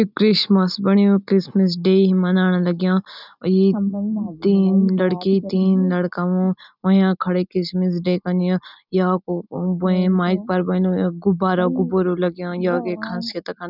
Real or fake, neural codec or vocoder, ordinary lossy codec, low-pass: real; none; none; 5.4 kHz